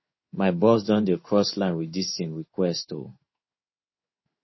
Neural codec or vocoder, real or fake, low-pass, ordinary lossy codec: codec, 16 kHz in and 24 kHz out, 1 kbps, XY-Tokenizer; fake; 7.2 kHz; MP3, 24 kbps